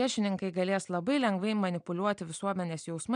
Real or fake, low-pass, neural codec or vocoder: real; 9.9 kHz; none